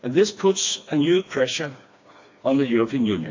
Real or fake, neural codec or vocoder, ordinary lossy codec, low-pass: fake; codec, 16 kHz, 2 kbps, FreqCodec, smaller model; AAC, 48 kbps; 7.2 kHz